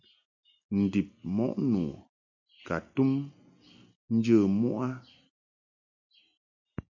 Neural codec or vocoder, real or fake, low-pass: none; real; 7.2 kHz